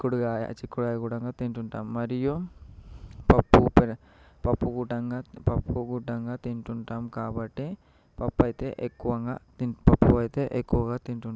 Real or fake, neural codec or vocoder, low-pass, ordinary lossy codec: real; none; none; none